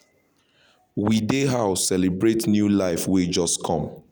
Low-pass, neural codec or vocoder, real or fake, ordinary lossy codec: none; none; real; none